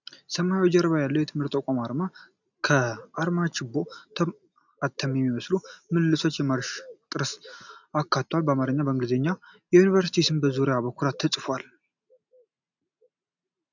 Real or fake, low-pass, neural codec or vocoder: real; 7.2 kHz; none